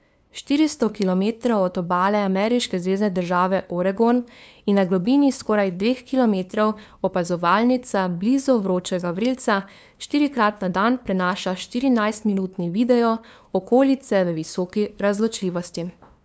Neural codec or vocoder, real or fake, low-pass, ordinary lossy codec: codec, 16 kHz, 2 kbps, FunCodec, trained on LibriTTS, 25 frames a second; fake; none; none